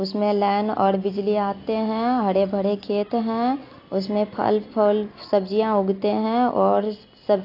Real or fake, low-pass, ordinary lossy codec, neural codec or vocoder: real; 5.4 kHz; AAC, 32 kbps; none